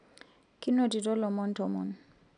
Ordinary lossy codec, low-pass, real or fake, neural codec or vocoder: none; 9.9 kHz; real; none